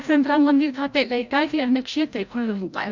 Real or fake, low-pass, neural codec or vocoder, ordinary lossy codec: fake; 7.2 kHz; codec, 16 kHz, 0.5 kbps, FreqCodec, larger model; none